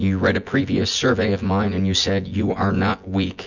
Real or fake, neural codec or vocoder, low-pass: fake; vocoder, 24 kHz, 100 mel bands, Vocos; 7.2 kHz